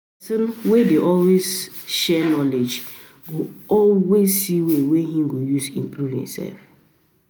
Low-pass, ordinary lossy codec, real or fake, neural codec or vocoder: none; none; real; none